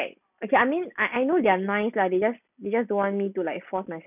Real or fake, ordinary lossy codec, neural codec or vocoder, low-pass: real; none; none; 3.6 kHz